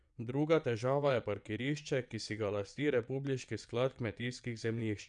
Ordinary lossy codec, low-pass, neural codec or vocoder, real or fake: none; 9.9 kHz; vocoder, 22.05 kHz, 80 mel bands, WaveNeXt; fake